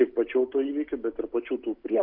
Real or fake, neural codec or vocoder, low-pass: real; none; 5.4 kHz